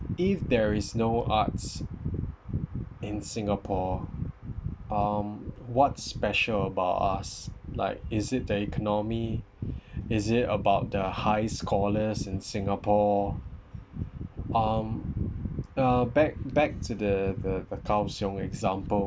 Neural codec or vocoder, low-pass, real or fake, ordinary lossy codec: none; none; real; none